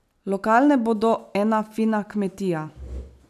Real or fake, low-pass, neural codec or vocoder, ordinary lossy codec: real; 14.4 kHz; none; none